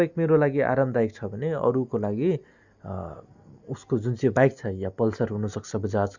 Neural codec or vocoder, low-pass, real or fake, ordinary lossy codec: none; 7.2 kHz; real; Opus, 64 kbps